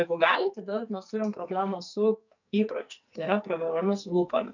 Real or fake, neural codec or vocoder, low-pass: fake; codec, 44.1 kHz, 2.6 kbps, SNAC; 7.2 kHz